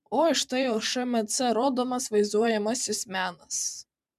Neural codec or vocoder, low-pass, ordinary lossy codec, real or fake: vocoder, 44.1 kHz, 128 mel bands every 512 samples, BigVGAN v2; 14.4 kHz; MP3, 96 kbps; fake